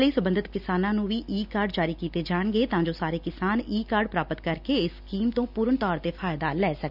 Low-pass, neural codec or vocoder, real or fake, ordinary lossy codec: 5.4 kHz; none; real; none